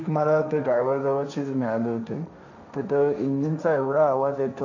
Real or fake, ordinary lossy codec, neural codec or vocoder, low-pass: fake; none; codec, 16 kHz, 1.1 kbps, Voila-Tokenizer; 7.2 kHz